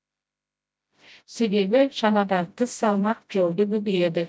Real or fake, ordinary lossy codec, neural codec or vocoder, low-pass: fake; none; codec, 16 kHz, 0.5 kbps, FreqCodec, smaller model; none